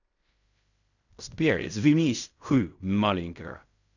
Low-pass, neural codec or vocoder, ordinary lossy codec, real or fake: 7.2 kHz; codec, 16 kHz in and 24 kHz out, 0.4 kbps, LongCat-Audio-Codec, fine tuned four codebook decoder; none; fake